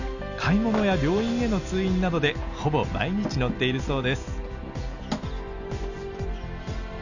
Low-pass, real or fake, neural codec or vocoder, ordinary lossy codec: 7.2 kHz; real; none; none